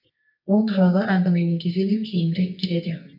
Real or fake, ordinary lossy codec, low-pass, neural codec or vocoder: fake; none; 5.4 kHz; codec, 24 kHz, 0.9 kbps, WavTokenizer, medium music audio release